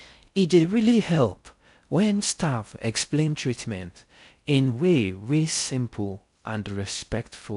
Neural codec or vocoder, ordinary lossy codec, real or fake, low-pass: codec, 16 kHz in and 24 kHz out, 0.6 kbps, FocalCodec, streaming, 4096 codes; none; fake; 10.8 kHz